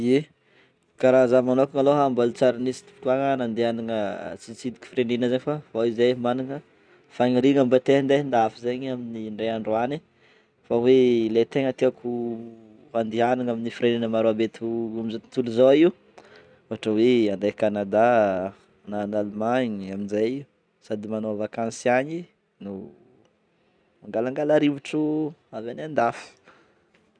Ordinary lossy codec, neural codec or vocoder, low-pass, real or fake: none; none; 9.9 kHz; real